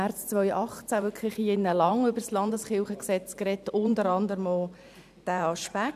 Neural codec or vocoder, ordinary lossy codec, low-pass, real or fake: vocoder, 44.1 kHz, 128 mel bands every 512 samples, BigVGAN v2; none; 14.4 kHz; fake